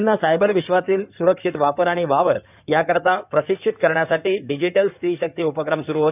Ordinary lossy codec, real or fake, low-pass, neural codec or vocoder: none; fake; 3.6 kHz; codec, 16 kHz in and 24 kHz out, 2.2 kbps, FireRedTTS-2 codec